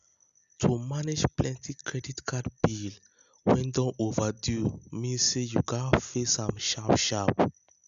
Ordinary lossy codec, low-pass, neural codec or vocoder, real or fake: none; 7.2 kHz; none; real